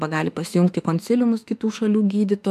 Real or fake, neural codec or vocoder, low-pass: fake; autoencoder, 48 kHz, 32 numbers a frame, DAC-VAE, trained on Japanese speech; 14.4 kHz